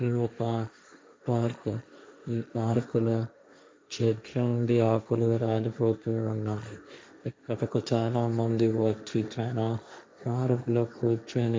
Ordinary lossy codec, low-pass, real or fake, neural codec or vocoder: none; none; fake; codec, 16 kHz, 1.1 kbps, Voila-Tokenizer